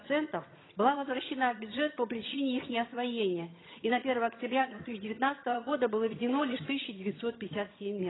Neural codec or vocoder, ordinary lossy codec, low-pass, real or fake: vocoder, 22.05 kHz, 80 mel bands, HiFi-GAN; AAC, 16 kbps; 7.2 kHz; fake